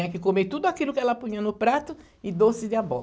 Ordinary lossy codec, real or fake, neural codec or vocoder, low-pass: none; real; none; none